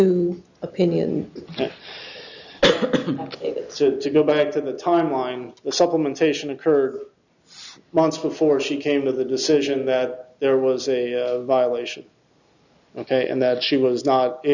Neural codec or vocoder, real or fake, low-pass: none; real; 7.2 kHz